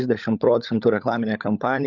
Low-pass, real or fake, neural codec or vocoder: 7.2 kHz; fake; codec, 16 kHz, 16 kbps, FunCodec, trained on LibriTTS, 50 frames a second